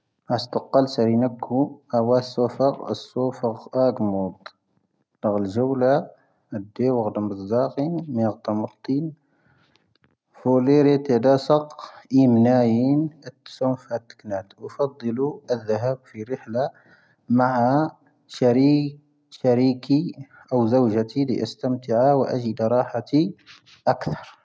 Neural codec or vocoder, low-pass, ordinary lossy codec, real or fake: none; none; none; real